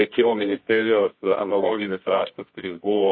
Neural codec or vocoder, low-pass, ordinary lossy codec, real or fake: codec, 24 kHz, 0.9 kbps, WavTokenizer, medium music audio release; 7.2 kHz; MP3, 24 kbps; fake